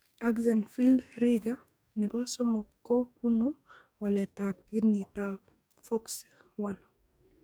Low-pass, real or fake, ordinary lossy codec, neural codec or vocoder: none; fake; none; codec, 44.1 kHz, 2.6 kbps, DAC